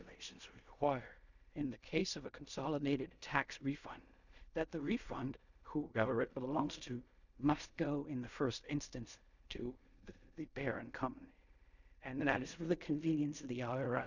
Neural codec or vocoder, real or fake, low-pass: codec, 16 kHz in and 24 kHz out, 0.4 kbps, LongCat-Audio-Codec, fine tuned four codebook decoder; fake; 7.2 kHz